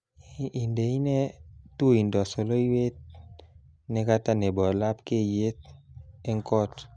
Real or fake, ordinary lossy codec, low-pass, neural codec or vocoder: real; none; 9.9 kHz; none